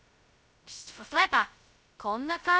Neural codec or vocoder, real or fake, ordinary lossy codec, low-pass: codec, 16 kHz, 0.2 kbps, FocalCodec; fake; none; none